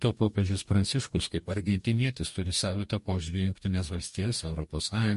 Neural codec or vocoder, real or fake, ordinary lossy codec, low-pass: codec, 44.1 kHz, 2.6 kbps, DAC; fake; MP3, 48 kbps; 14.4 kHz